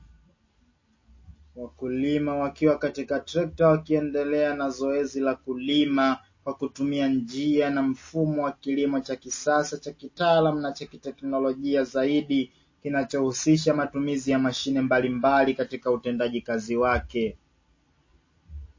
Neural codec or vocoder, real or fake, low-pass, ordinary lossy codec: none; real; 7.2 kHz; MP3, 32 kbps